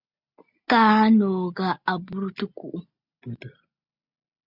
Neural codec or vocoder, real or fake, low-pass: none; real; 5.4 kHz